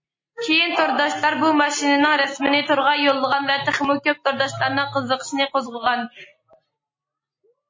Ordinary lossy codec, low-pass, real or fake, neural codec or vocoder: MP3, 32 kbps; 7.2 kHz; real; none